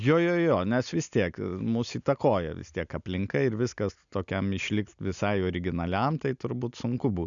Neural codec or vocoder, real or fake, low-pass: none; real; 7.2 kHz